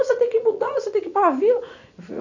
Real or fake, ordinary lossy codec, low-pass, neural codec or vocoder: fake; none; 7.2 kHz; vocoder, 44.1 kHz, 80 mel bands, Vocos